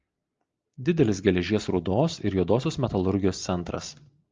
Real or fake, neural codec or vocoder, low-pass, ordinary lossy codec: real; none; 7.2 kHz; Opus, 24 kbps